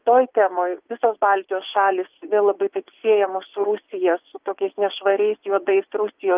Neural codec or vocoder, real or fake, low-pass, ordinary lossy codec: vocoder, 24 kHz, 100 mel bands, Vocos; fake; 3.6 kHz; Opus, 24 kbps